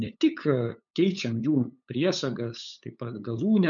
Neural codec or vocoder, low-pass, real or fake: codec, 16 kHz, 8 kbps, FunCodec, trained on LibriTTS, 25 frames a second; 7.2 kHz; fake